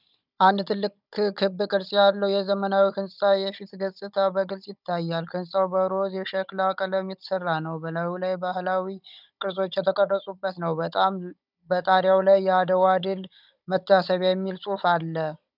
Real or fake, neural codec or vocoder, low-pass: fake; codec, 16 kHz, 16 kbps, FunCodec, trained on Chinese and English, 50 frames a second; 5.4 kHz